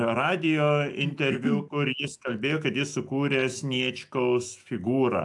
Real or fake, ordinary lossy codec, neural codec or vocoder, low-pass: fake; AAC, 64 kbps; autoencoder, 48 kHz, 128 numbers a frame, DAC-VAE, trained on Japanese speech; 10.8 kHz